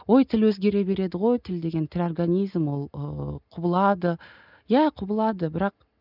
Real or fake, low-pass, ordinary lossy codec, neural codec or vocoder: real; 5.4 kHz; none; none